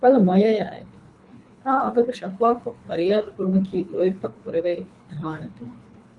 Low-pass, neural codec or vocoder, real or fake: 10.8 kHz; codec, 24 kHz, 3 kbps, HILCodec; fake